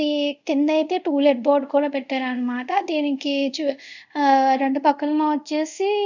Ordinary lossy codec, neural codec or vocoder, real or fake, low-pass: none; codec, 24 kHz, 0.5 kbps, DualCodec; fake; 7.2 kHz